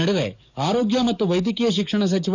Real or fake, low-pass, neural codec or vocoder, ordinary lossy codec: fake; 7.2 kHz; codec, 44.1 kHz, 7.8 kbps, DAC; none